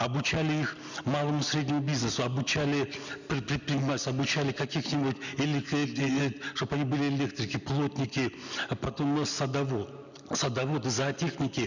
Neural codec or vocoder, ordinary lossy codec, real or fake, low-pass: none; none; real; 7.2 kHz